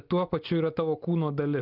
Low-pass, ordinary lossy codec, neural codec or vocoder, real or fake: 5.4 kHz; Opus, 24 kbps; none; real